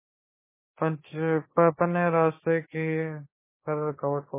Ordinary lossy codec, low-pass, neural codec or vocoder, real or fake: MP3, 16 kbps; 3.6 kHz; codec, 16 kHz, 6 kbps, DAC; fake